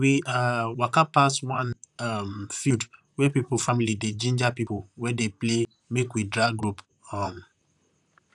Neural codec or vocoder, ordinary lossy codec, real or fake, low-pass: vocoder, 44.1 kHz, 128 mel bands, Pupu-Vocoder; none; fake; 10.8 kHz